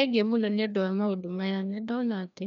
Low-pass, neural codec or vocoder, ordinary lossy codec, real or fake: 7.2 kHz; codec, 16 kHz, 1 kbps, FreqCodec, larger model; none; fake